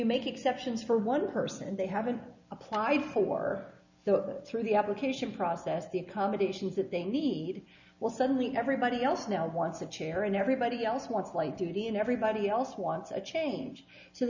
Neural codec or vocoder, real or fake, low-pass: none; real; 7.2 kHz